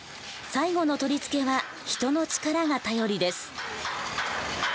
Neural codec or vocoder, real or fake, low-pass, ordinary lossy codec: none; real; none; none